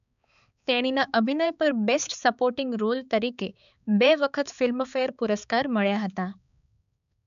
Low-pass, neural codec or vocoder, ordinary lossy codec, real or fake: 7.2 kHz; codec, 16 kHz, 4 kbps, X-Codec, HuBERT features, trained on balanced general audio; none; fake